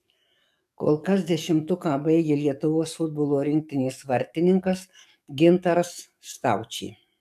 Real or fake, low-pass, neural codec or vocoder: fake; 14.4 kHz; codec, 44.1 kHz, 7.8 kbps, DAC